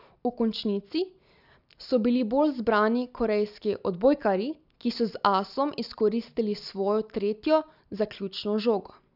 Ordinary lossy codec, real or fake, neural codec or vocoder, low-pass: none; real; none; 5.4 kHz